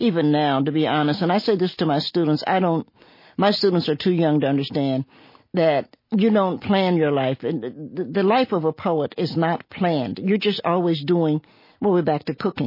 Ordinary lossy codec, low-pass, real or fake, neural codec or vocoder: MP3, 24 kbps; 5.4 kHz; real; none